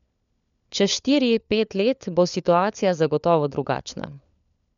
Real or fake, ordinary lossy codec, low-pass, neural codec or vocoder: fake; none; 7.2 kHz; codec, 16 kHz, 4 kbps, FunCodec, trained on LibriTTS, 50 frames a second